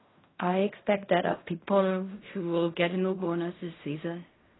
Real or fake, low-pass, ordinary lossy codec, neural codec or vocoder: fake; 7.2 kHz; AAC, 16 kbps; codec, 16 kHz in and 24 kHz out, 0.4 kbps, LongCat-Audio-Codec, fine tuned four codebook decoder